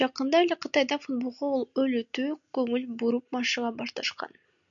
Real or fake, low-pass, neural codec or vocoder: real; 7.2 kHz; none